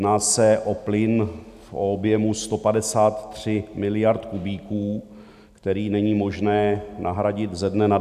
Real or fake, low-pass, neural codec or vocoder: real; 14.4 kHz; none